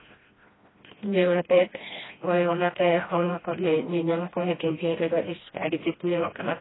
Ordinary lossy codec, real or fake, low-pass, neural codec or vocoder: AAC, 16 kbps; fake; 7.2 kHz; codec, 16 kHz, 1 kbps, FreqCodec, smaller model